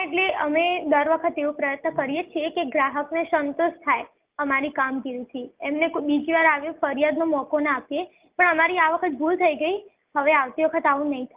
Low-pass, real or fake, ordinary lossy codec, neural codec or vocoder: 3.6 kHz; real; Opus, 32 kbps; none